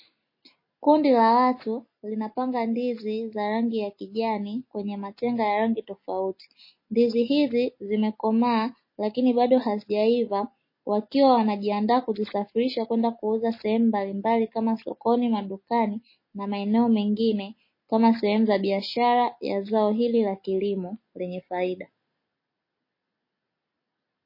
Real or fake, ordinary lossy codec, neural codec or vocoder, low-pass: real; MP3, 24 kbps; none; 5.4 kHz